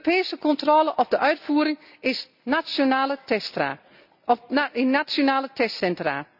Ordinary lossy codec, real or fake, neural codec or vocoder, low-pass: none; real; none; 5.4 kHz